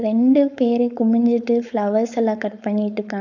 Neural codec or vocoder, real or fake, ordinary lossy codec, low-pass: codec, 16 kHz, 4.8 kbps, FACodec; fake; none; 7.2 kHz